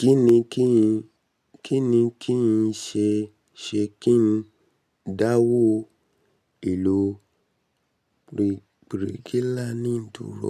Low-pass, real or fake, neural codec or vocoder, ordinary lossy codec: 14.4 kHz; real; none; none